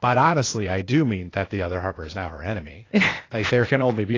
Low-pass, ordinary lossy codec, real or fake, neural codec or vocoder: 7.2 kHz; AAC, 32 kbps; fake; codec, 16 kHz, 0.8 kbps, ZipCodec